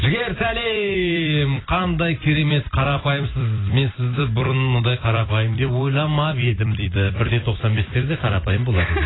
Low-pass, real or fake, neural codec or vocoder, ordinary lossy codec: 7.2 kHz; fake; vocoder, 44.1 kHz, 128 mel bands every 256 samples, BigVGAN v2; AAC, 16 kbps